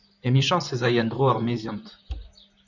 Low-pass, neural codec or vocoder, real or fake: 7.2 kHz; vocoder, 44.1 kHz, 128 mel bands, Pupu-Vocoder; fake